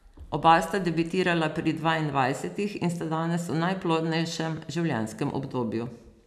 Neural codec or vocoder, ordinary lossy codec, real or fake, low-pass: none; none; real; 14.4 kHz